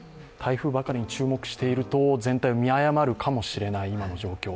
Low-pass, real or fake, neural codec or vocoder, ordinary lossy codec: none; real; none; none